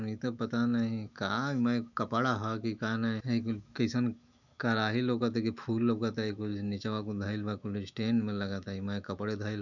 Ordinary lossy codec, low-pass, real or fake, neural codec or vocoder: none; 7.2 kHz; fake; autoencoder, 48 kHz, 128 numbers a frame, DAC-VAE, trained on Japanese speech